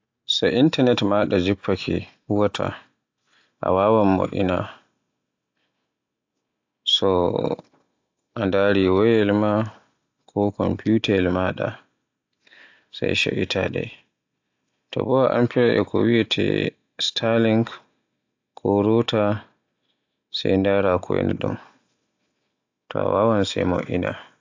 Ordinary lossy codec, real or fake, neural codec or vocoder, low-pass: AAC, 48 kbps; real; none; 7.2 kHz